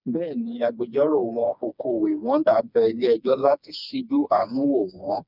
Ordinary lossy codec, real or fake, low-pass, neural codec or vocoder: none; fake; 5.4 kHz; codec, 16 kHz, 2 kbps, FreqCodec, smaller model